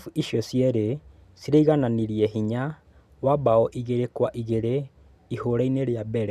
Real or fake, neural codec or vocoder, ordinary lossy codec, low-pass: real; none; none; 14.4 kHz